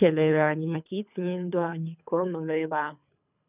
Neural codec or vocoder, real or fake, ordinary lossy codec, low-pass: codec, 24 kHz, 3 kbps, HILCodec; fake; none; 3.6 kHz